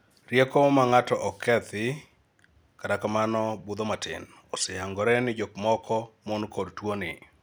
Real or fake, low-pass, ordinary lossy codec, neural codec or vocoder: real; none; none; none